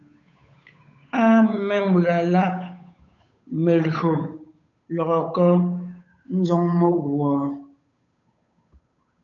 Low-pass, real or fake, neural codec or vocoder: 7.2 kHz; fake; codec, 16 kHz, 8 kbps, FunCodec, trained on Chinese and English, 25 frames a second